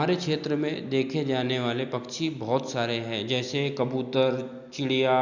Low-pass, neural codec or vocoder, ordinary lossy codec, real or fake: 7.2 kHz; none; Opus, 64 kbps; real